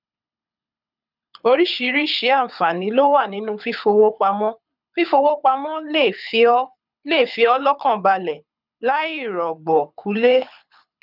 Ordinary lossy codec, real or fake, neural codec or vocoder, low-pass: none; fake; codec, 24 kHz, 6 kbps, HILCodec; 5.4 kHz